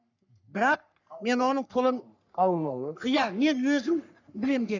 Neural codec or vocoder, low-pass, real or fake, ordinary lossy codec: codec, 44.1 kHz, 3.4 kbps, Pupu-Codec; 7.2 kHz; fake; none